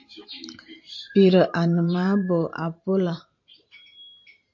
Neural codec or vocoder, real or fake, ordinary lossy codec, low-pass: vocoder, 44.1 kHz, 128 mel bands every 512 samples, BigVGAN v2; fake; MP3, 48 kbps; 7.2 kHz